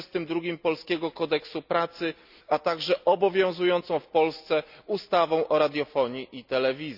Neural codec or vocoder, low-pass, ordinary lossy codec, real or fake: none; 5.4 kHz; none; real